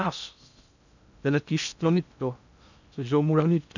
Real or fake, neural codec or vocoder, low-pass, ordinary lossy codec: fake; codec, 16 kHz in and 24 kHz out, 0.6 kbps, FocalCodec, streaming, 2048 codes; 7.2 kHz; none